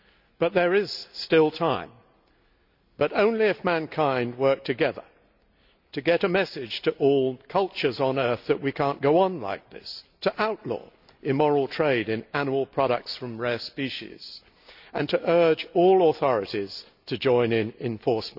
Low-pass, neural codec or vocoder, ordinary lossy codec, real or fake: 5.4 kHz; none; none; real